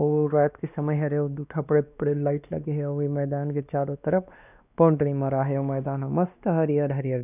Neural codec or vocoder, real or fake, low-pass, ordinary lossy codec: codec, 16 kHz, 2 kbps, X-Codec, WavLM features, trained on Multilingual LibriSpeech; fake; 3.6 kHz; none